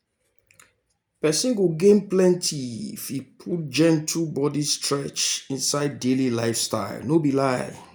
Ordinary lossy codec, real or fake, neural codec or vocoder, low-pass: none; real; none; none